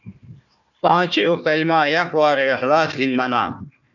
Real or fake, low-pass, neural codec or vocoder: fake; 7.2 kHz; codec, 16 kHz, 1 kbps, FunCodec, trained on Chinese and English, 50 frames a second